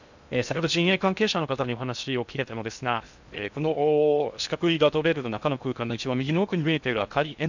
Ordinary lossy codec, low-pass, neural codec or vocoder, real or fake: none; 7.2 kHz; codec, 16 kHz in and 24 kHz out, 0.6 kbps, FocalCodec, streaming, 2048 codes; fake